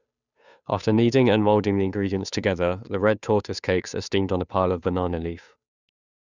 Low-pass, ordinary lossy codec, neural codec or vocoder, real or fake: 7.2 kHz; none; codec, 16 kHz, 2 kbps, FunCodec, trained on Chinese and English, 25 frames a second; fake